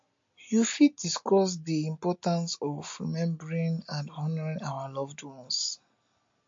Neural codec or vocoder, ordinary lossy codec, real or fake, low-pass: none; MP3, 48 kbps; real; 7.2 kHz